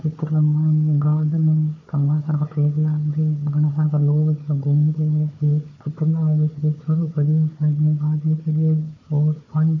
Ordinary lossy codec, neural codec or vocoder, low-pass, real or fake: none; codec, 16 kHz, 4 kbps, FunCodec, trained on Chinese and English, 50 frames a second; 7.2 kHz; fake